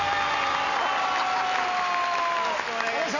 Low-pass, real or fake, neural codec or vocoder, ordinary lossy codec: 7.2 kHz; real; none; none